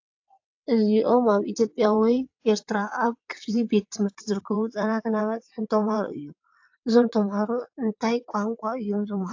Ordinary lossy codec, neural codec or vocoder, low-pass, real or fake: AAC, 48 kbps; vocoder, 22.05 kHz, 80 mel bands, WaveNeXt; 7.2 kHz; fake